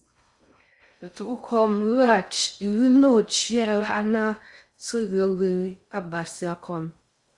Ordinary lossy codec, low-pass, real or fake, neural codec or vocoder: Opus, 64 kbps; 10.8 kHz; fake; codec, 16 kHz in and 24 kHz out, 0.6 kbps, FocalCodec, streaming, 4096 codes